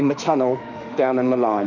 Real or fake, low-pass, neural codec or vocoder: fake; 7.2 kHz; autoencoder, 48 kHz, 32 numbers a frame, DAC-VAE, trained on Japanese speech